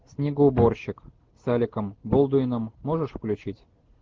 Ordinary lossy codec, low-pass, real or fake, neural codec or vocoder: Opus, 16 kbps; 7.2 kHz; real; none